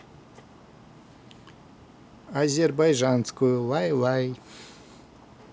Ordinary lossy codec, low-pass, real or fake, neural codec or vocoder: none; none; real; none